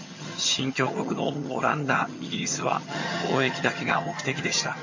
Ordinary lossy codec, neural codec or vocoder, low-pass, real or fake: MP3, 32 kbps; vocoder, 22.05 kHz, 80 mel bands, HiFi-GAN; 7.2 kHz; fake